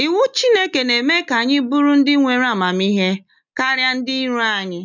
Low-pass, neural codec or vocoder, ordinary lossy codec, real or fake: 7.2 kHz; none; none; real